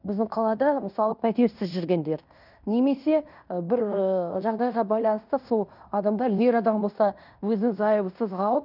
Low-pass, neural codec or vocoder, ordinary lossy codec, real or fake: 5.4 kHz; codec, 16 kHz in and 24 kHz out, 0.9 kbps, LongCat-Audio-Codec, fine tuned four codebook decoder; none; fake